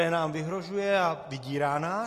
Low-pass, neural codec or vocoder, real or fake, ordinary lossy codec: 14.4 kHz; none; real; AAC, 48 kbps